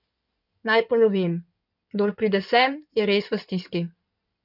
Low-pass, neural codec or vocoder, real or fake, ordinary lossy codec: 5.4 kHz; codec, 16 kHz in and 24 kHz out, 2.2 kbps, FireRedTTS-2 codec; fake; none